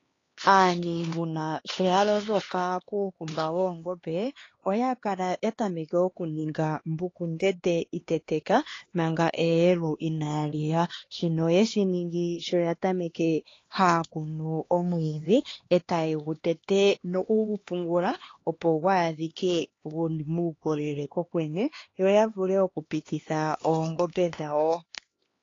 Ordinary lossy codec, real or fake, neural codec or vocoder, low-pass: AAC, 32 kbps; fake; codec, 16 kHz, 2 kbps, X-Codec, HuBERT features, trained on LibriSpeech; 7.2 kHz